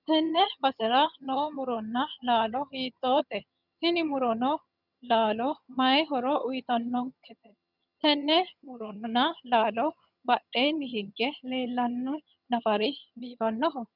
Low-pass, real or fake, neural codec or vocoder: 5.4 kHz; fake; vocoder, 22.05 kHz, 80 mel bands, HiFi-GAN